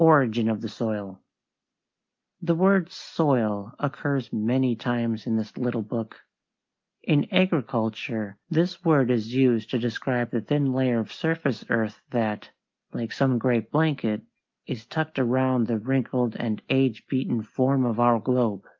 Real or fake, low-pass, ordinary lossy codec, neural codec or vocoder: real; 7.2 kHz; Opus, 32 kbps; none